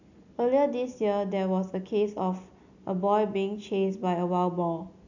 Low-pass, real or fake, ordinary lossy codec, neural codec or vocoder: 7.2 kHz; real; none; none